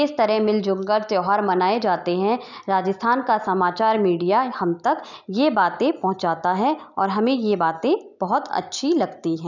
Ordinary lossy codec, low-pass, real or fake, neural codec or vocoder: none; 7.2 kHz; real; none